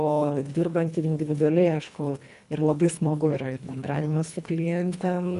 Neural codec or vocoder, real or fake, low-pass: codec, 24 kHz, 1.5 kbps, HILCodec; fake; 10.8 kHz